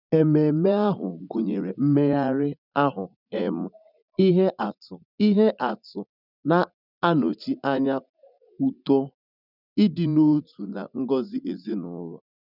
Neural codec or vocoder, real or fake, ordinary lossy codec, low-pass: vocoder, 44.1 kHz, 80 mel bands, Vocos; fake; none; 5.4 kHz